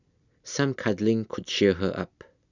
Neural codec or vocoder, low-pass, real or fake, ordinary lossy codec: none; 7.2 kHz; real; none